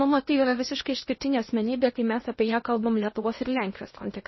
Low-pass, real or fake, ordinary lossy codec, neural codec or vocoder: 7.2 kHz; fake; MP3, 24 kbps; codec, 16 kHz in and 24 kHz out, 0.8 kbps, FocalCodec, streaming, 65536 codes